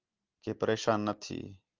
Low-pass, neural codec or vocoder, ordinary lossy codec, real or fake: 7.2 kHz; none; Opus, 32 kbps; real